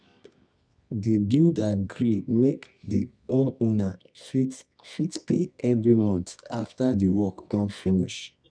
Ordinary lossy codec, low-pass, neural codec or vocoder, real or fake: none; 9.9 kHz; codec, 24 kHz, 0.9 kbps, WavTokenizer, medium music audio release; fake